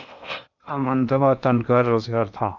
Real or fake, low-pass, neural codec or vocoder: fake; 7.2 kHz; codec, 16 kHz in and 24 kHz out, 0.8 kbps, FocalCodec, streaming, 65536 codes